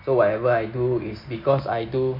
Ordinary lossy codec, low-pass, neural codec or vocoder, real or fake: none; 5.4 kHz; none; real